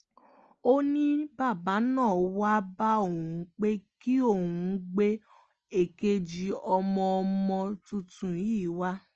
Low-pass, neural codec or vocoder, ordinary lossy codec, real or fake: 7.2 kHz; none; Opus, 24 kbps; real